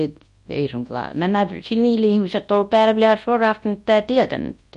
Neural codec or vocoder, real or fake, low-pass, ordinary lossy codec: codec, 24 kHz, 0.9 kbps, WavTokenizer, large speech release; fake; 10.8 kHz; MP3, 48 kbps